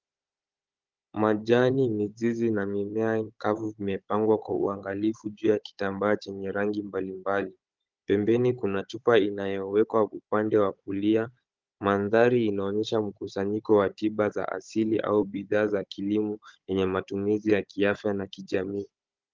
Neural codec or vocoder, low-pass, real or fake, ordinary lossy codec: codec, 16 kHz, 16 kbps, FunCodec, trained on Chinese and English, 50 frames a second; 7.2 kHz; fake; Opus, 24 kbps